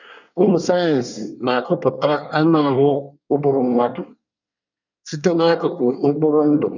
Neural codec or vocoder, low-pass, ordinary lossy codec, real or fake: codec, 24 kHz, 1 kbps, SNAC; 7.2 kHz; none; fake